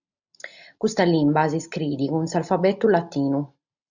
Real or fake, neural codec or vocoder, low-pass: real; none; 7.2 kHz